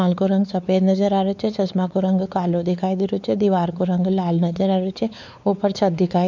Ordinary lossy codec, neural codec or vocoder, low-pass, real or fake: none; codec, 16 kHz, 4 kbps, X-Codec, WavLM features, trained on Multilingual LibriSpeech; 7.2 kHz; fake